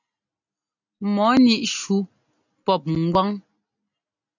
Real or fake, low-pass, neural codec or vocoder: real; 7.2 kHz; none